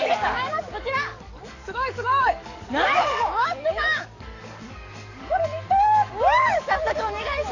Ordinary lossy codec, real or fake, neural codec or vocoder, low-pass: none; fake; codec, 44.1 kHz, 7.8 kbps, DAC; 7.2 kHz